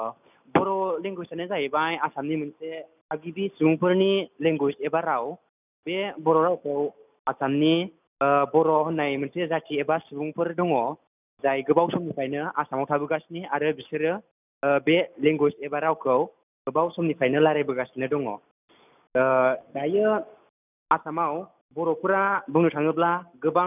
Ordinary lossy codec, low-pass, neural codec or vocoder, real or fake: none; 3.6 kHz; none; real